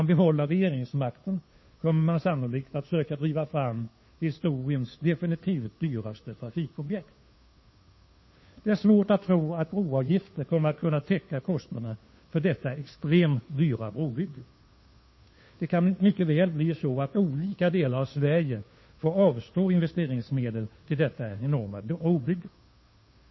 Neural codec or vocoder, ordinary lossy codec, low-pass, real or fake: codec, 16 kHz, 2 kbps, FunCodec, trained on Chinese and English, 25 frames a second; MP3, 24 kbps; 7.2 kHz; fake